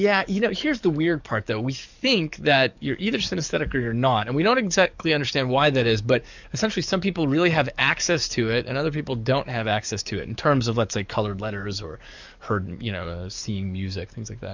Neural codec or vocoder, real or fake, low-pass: codec, 44.1 kHz, 7.8 kbps, DAC; fake; 7.2 kHz